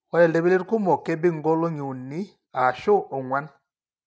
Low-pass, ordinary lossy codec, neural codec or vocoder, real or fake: none; none; none; real